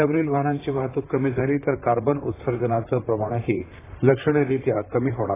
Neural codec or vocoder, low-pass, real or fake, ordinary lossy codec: vocoder, 44.1 kHz, 128 mel bands, Pupu-Vocoder; 3.6 kHz; fake; AAC, 16 kbps